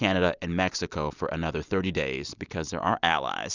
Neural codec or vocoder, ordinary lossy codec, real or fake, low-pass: none; Opus, 64 kbps; real; 7.2 kHz